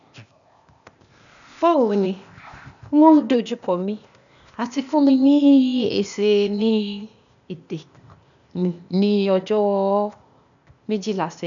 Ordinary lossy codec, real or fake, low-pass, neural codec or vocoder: none; fake; 7.2 kHz; codec, 16 kHz, 0.8 kbps, ZipCodec